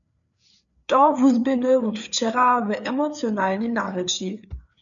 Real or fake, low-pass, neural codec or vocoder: fake; 7.2 kHz; codec, 16 kHz, 4 kbps, FreqCodec, larger model